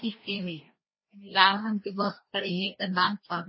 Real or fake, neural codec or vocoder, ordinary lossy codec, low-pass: fake; codec, 16 kHz, 1 kbps, FreqCodec, larger model; MP3, 24 kbps; 7.2 kHz